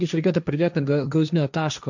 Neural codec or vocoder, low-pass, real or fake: codec, 16 kHz, 1.1 kbps, Voila-Tokenizer; 7.2 kHz; fake